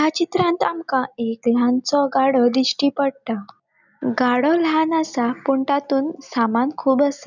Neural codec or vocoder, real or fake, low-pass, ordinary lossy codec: none; real; 7.2 kHz; none